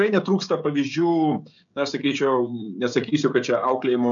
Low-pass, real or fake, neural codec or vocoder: 7.2 kHz; fake; codec, 16 kHz, 16 kbps, FreqCodec, smaller model